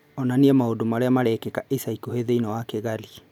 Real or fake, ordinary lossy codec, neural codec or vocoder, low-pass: real; none; none; 19.8 kHz